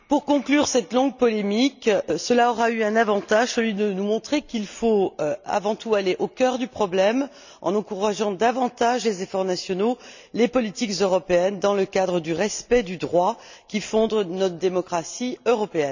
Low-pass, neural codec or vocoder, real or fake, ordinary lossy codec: 7.2 kHz; none; real; none